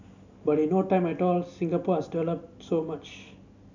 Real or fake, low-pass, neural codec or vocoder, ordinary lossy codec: real; 7.2 kHz; none; none